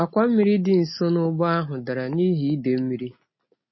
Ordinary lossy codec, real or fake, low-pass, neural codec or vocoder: MP3, 24 kbps; real; 7.2 kHz; none